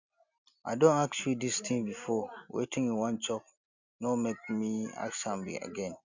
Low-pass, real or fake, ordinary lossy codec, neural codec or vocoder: 7.2 kHz; real; Opus, 64 kbps; none